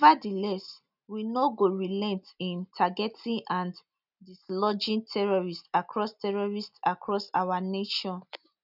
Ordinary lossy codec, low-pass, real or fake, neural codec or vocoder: none; 5.4 kHz; real; none